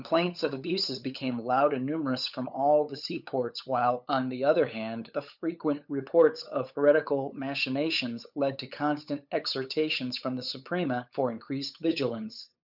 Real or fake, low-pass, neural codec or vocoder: fake; 5.4 kHz; codec, 16 kHz, 8 kbps, FunCodec, trained on LibriTTS, 25 frames a second